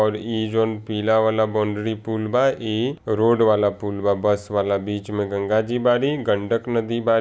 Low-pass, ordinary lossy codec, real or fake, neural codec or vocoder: none; none; real; none